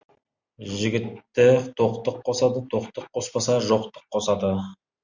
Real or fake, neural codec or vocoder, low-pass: real; none; 7.2 kHz